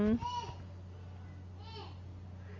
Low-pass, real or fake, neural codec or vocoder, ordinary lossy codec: 7.2 kHz; real; none; Opus, 32 kbps